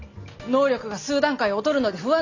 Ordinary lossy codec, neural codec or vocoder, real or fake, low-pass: Opus, 64 kbps; none; real; 7.2 kHz